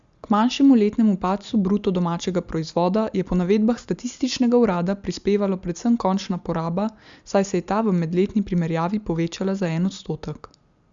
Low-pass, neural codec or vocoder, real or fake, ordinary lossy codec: 7.2 kHz; none; real; Opus, 64 kbps